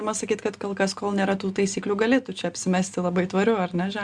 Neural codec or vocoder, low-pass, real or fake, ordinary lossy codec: none; 9.9 kHz; real; Opus, 64 kbps